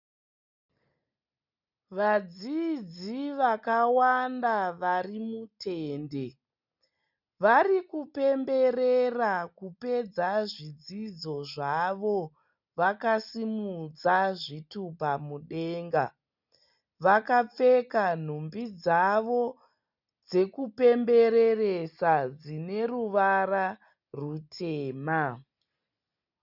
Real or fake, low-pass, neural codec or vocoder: real; 5.4 kHz; none